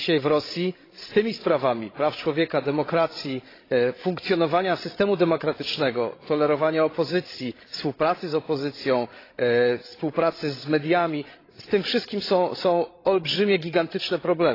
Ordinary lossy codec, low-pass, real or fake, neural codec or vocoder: AAC, 24 kbps; 5.4 kHz; fake; codec, 16 kHz, 16 kbps, FreqCodec, larger model